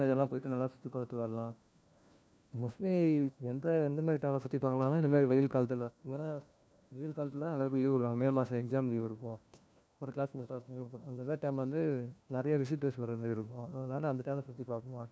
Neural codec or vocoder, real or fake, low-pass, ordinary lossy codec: codec, 16 kHz, 1 kbps, FunCodec, trained on LibriTTS, 50 frames a second; fake; none; none